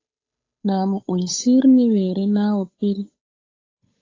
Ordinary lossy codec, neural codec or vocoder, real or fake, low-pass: AAC, 32 kbps; codec, 16 kHz, 8 kbps, FunCodec, trained on Chinese and English, 25 frames a second; fake; 7.2 kHz